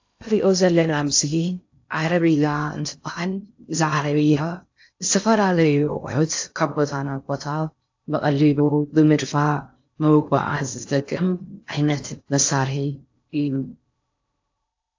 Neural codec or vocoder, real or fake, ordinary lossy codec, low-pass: codec, 16 kHz in and 24 kHz out, 0.6 kbps, FocalCodec, streaming, 2048 codes; fake; AAC, 48 kbps; 7.2 kHz